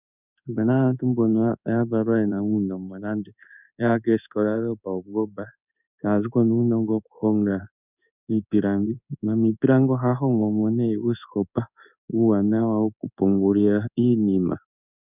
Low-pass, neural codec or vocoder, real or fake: 3.6 kHz; codec, 16 kHz in and 24 kHz out, 1 kbps, XY-Tokenizer; fake